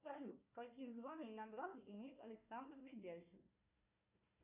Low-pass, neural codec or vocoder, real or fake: 3.6 kHz; codec, 16 kHz, 1 kbps, FunCodec, trained on Chinese and English, 50 frames a second; fake